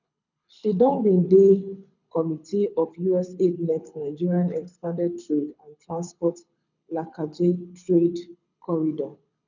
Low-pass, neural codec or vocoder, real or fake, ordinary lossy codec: 7.2 kHz; codec, 24 kHz, 6 kbps, HILCodec; fake; none